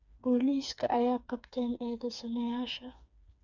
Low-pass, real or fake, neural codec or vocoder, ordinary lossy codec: 7.2 kHz; fake; codec, 16 kHz, 4 kbps, FreqCodec, smaller model; none